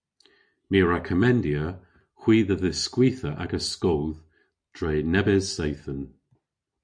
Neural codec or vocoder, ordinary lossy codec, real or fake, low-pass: none; MP3, 64 kbps; real; 9.9 kHz